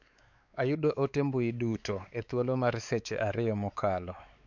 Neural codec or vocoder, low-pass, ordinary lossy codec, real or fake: codec, 16 kHz, 4 kbps, X-Codec, WavLM features, trained on Multilingual LibriSpeech; 7.2 kHz; none; fake